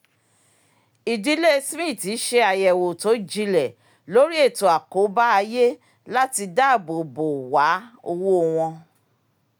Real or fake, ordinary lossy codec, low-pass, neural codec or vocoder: real; none; none; none